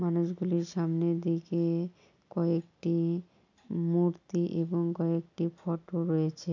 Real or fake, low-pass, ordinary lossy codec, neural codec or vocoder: real; 7.2 kHz; none; none